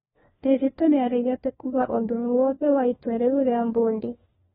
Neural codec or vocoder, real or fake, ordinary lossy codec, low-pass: codec, 16 kHz, 1 kbps, FunCodec, trained on LibriTTS, 50 frames a second; fake; AAC, 16 kbps; 7.2 kHz